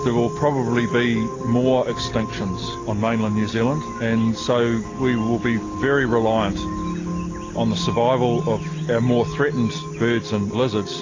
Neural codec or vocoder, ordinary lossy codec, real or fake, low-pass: none; AAC, 32 kbps; real; 7.2 kHz